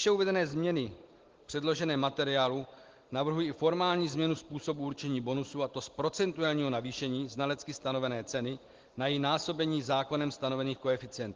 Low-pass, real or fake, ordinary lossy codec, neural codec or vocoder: 7.2 kHz; real; Opus, 16 kbps; none